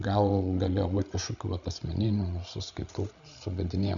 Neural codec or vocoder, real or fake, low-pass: codec, 16 kHz, 16 kbps, FreqCodec, larger model; fake; 7.2 kHz